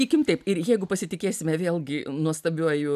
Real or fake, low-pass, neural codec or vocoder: real; 14.4 kHz; none